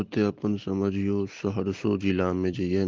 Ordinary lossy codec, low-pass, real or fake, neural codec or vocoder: Opus, 16 kbps; 7.2 kHz; real; none